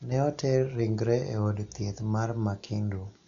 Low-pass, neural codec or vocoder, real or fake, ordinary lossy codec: 7.2 kHz; none; real; none